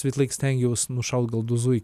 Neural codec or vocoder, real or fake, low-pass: none; real; 14.4 kHz